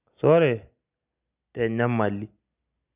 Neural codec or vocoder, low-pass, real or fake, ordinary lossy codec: none; 3.6 kHz; real; none